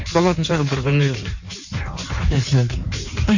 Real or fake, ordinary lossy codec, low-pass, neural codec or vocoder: fake; none; 7.2 kHz; codec, 16 kHz in and 24 kHz out, 1.1 kbps, FireRedTTS-2 codec